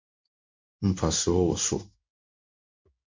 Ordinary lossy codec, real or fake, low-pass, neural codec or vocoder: MP3, 64 kbps; fake; 7.2 kHz; codec, 16 kHz in and 24 kHz out, 1 kbps, XY-Tokenizer